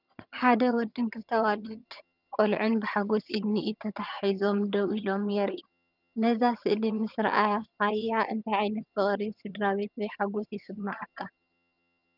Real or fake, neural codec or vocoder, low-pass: fake; vocoder, 22.05 kHz, 80 mel bands, HiFi-GAN; 5.4 kHz